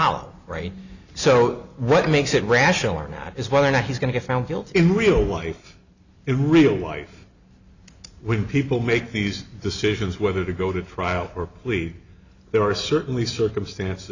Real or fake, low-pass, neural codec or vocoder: real; 7.2 kHz; none